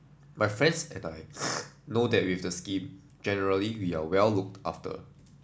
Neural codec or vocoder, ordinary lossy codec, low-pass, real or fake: none; none; none; real